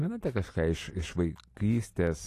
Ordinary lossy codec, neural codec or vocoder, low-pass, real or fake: AAC, 48 kbps; none; 14.4 kHz; real